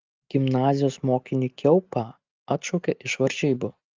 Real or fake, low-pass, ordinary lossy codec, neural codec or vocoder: real; 7.2 kHz; Opus, 24 kbps; none